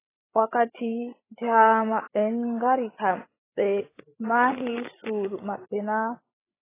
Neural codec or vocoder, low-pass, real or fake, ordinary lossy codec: codec, 16 kHz, 16 kbps, FreqCodec, larger model; 3.6 kHz; fake; AAC, 16 kbps